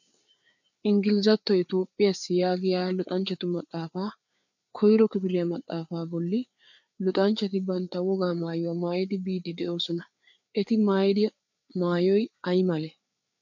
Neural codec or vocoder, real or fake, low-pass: codec, 16 kHz, 4 kbps, FreqCodec, larger model; fake; 7.2 kHz